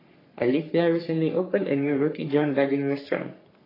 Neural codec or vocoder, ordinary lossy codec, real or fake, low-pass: codec, 44.1 kHz, 3.4 kbps, Pupu-Codec; AAC, 24 kbps; fake; 5.4 kHz